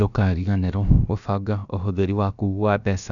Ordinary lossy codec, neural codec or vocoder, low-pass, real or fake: none; codec, 16 kHz, about 1 kbps, DyCAST, with the encoder's durations; 7.2 kHz; fake